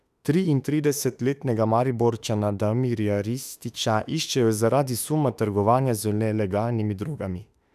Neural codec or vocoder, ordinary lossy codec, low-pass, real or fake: autoencoder, 48 kHz, 32 numbers a frame, DAC-VAE, trained on Japanese speech; none; 14.4 kHz; fake